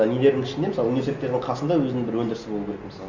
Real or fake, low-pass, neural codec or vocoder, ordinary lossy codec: real; 7.2 kHz; none; Opus, 64 kbps